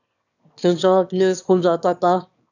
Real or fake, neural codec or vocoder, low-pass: fake; autoencoder, 22.05 kHz, a latent of 192 numbers a frame, VITS, trained on one speaker; 7.2 kHz